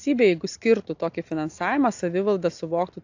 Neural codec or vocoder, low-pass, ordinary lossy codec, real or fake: none; 7.2 kHz; AAC, 48 kbps; real